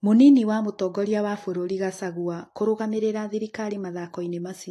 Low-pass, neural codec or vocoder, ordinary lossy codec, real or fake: 14.4 kHz; none; AAC, 48 kbps; real